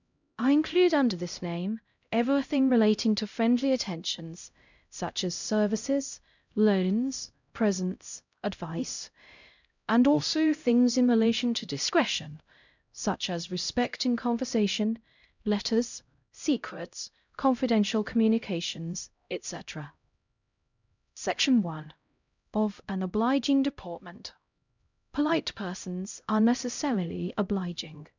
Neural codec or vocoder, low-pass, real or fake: codec, 16 kHz, 0.5 kbps, X-Codec, HuBERT features, trained on LibriSpeech; 7.2 kHz; fake